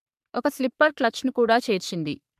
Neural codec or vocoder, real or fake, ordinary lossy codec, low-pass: codec, 44.1 kHz, 3.4 kbps, Pupu-Codec; fake; MP3, 96 kbps; 14.4 kHz